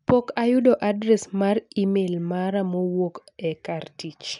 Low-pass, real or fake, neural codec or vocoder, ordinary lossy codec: 10.8 kHz; real; none; none